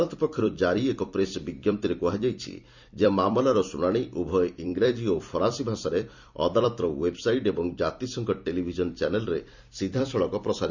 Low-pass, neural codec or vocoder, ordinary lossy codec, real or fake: 7.2 kHz; vocoder, 44.1 kHz, 128 mel bands every 256 samples, BigVGAN v2; Opus, 64 kbps; fake